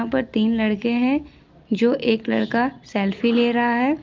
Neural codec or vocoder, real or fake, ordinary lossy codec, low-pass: none; real; Opus, 24 kbps; 7.2 kHz